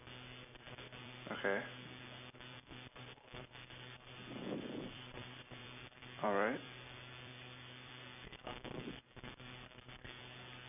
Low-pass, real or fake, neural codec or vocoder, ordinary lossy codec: 3.6 kHz; fake; vocoder, 44.1 kHz, 128 mel bands every 256 samples, BigVGAN v2; none